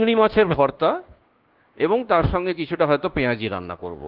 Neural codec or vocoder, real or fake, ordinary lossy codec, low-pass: codec, 24 kHz, 1.2 kbps, DualCodec; fake; Opus, 16 kbps; 5.4 kHz